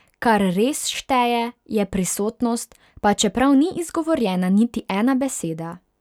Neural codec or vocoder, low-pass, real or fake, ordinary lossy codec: none; 19.8 kHz; real; none